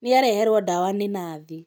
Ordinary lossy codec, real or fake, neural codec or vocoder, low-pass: none; real; none; none